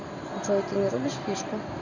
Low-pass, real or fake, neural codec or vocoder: 7.2 kHz; real; none